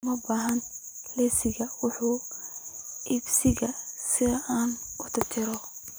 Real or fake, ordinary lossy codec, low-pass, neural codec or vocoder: real; none; none; none